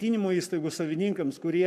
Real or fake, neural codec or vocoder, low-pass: fake; codec, 44.1 kHz, 7.8 kbps, Pupu-Codec; 14.4 kHz